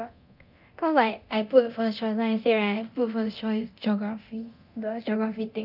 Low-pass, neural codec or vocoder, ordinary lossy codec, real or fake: 5.4 kHz; codec, 24 kHz, 0.9 kbps, DualCodec; none; fake